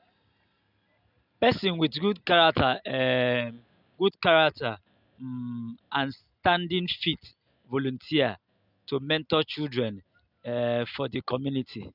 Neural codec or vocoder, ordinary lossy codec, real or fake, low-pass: none; none; real; 5.4 kHz